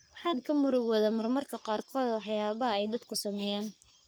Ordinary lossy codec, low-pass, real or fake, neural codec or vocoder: none; none; fake; codec, 44.1 kHz, 3.4 kbps, Pupu-Codec